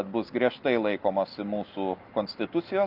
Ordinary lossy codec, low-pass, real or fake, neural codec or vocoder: Opus, 32 kbps; 5.4 kHz; real; none